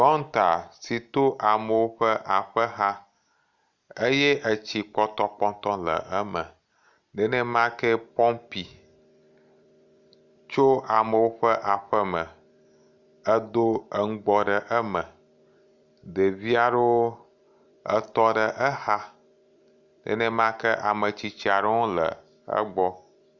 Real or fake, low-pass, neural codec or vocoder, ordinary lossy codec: real; 7.2 kHz; none; Opus, 64 kbps